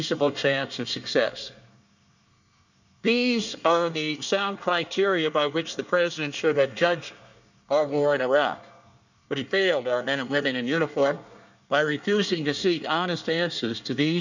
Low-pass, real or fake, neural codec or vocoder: 7.2 kHz; fake; codec, 24 kHz, 1 kbps, SNAC